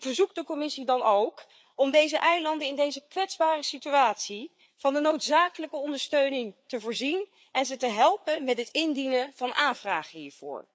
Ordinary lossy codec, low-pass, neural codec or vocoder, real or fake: none; none; codec, 16 kHz, 4 kbps, FreqCodec, larger model; fake